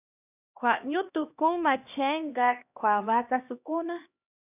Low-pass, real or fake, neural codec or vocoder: 3.6 kHz; fake; codec, 16 kHz, 1 kbps, X-Codec, HuBERT features, trained on LibriSpeech